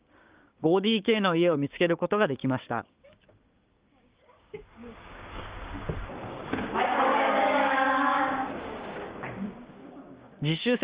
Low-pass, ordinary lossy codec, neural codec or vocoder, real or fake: 3.6 kHz; Opus, 32 kbps; codec, 16 kHz, 6 kbps, DAC; fake